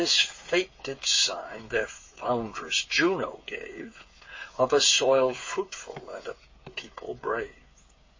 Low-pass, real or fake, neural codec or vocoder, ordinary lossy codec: 7.2 kHz; fake; codec, 16 kHz, 8 kbps, FreqCodec, smaller model; MP3, 32 kbps